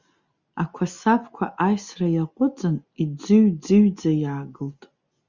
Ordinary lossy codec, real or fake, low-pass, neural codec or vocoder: Opus, 64 kbps; real; 7.2 kHz; none